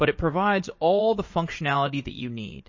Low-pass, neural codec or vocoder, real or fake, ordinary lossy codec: 7.2 kHz; vocoder, 44.1 kHz, 80 mel bands, Vocos; fake; MP3, 32 kbps